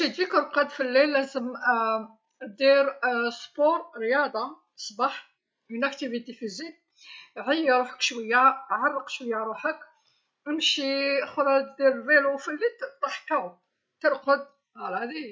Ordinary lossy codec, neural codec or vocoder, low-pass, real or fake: none; none; none; real